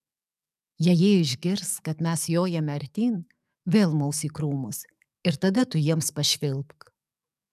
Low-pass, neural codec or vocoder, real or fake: 14.4 kHz; codec, 44.1 kHz, 7.8 kbps, DAC; fake